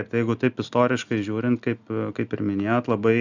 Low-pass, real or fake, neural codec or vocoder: 7.2 kHz; real; none